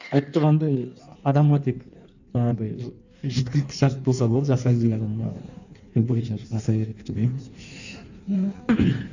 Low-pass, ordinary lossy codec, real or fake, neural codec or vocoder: 7.2 kHz; none; fake; codec, 16 kHz in and 24 kHz out, 1.1 kbps, FireRedTTS-2 codec